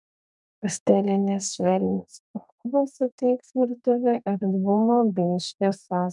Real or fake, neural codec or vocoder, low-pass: fake; codec, 32 kHz, 1.9 kbps, SNAC; 10.8 kHz